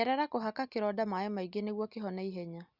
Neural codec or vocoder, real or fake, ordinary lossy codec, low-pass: none; real; Opus, 64 kbps; 5.4 kHz